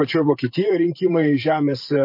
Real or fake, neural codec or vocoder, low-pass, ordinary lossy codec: fake; vocoder, 44.1 kHz, 128 mel bands every 512 samples, BigVGAN v2; 5.4 kHz; MP3, 32 kbps